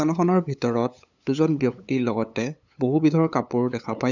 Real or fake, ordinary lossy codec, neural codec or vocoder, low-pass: fake; none; codec, 16 kHz, 8 kbps, FunCodec, trained on LibriTTS, 25 frames a second; 7.2 kHz